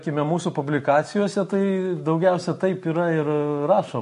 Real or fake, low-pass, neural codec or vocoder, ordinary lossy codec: real; 14.4 kHz; none; MP3, 48 kbps